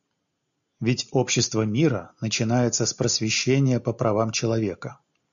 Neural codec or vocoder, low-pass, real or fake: none; 7.2 kHz; real